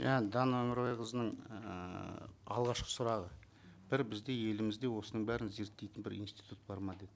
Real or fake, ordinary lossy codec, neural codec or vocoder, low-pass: real; none; none; none